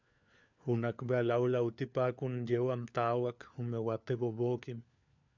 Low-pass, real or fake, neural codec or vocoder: 7.2 kHz; fake; codec, 16 kHz, 4 kbps, FunCodec, trained on LibriTTS, 50 frames a second